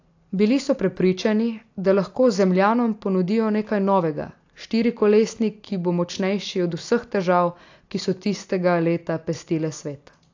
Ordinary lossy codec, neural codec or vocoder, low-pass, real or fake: AAC, 48 kbps; none; 7.2 kHz; real